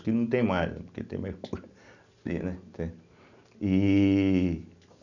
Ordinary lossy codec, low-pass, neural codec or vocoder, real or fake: none; 7.2 kHz; none; real